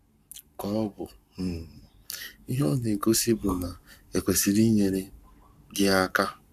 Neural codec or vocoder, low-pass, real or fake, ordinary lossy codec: codec, 44.1 kHz, 7.8 kbps, Pupu-Codec; 14.4 kHz; fake; none